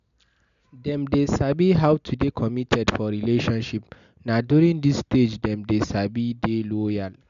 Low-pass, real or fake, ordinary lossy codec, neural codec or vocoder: 7.2 kHz; real; MP3, 96 kbps; none